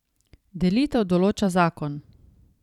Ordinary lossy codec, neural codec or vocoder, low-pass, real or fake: none; vocoder, 44.1 kHz, 128 mel bands every 512 samples, BigVGAN v2; 19.8 kHz; fake